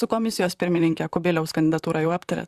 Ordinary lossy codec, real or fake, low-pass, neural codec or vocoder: Opus, 64 kbps; fake; 14.4 kHz; vocoder, 44.1 kHz, 128 mel bands, Pupu-Vocoder